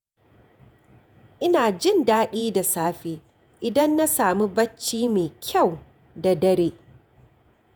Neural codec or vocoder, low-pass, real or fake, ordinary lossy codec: vocoder, 48 kHz, 128 mel bands, Vocos; none; fake; none